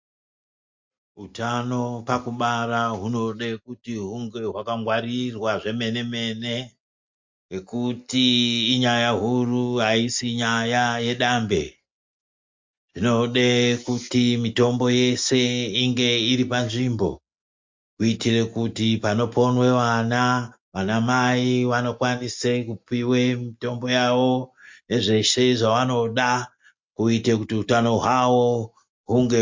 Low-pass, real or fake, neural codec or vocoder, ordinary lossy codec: 7.2 kHz; real; none; MP3, 48 kbps